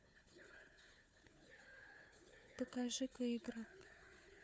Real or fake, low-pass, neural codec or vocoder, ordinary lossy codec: fake; none; codec, 16 kHz, 4 kbps, FunCodec, trained on Chinese and English, 50 frames a second; none